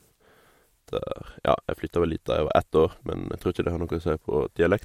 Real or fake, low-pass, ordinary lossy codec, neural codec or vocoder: real; 19.8 kHz; MP3, 64 kbps; none